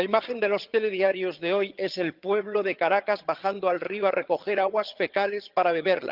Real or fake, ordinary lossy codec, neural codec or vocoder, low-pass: fake; Opus, 32 kbps; vocoder, 22.05 kHz, 80 mel bands, HiFi-GAN; 5.4 kHz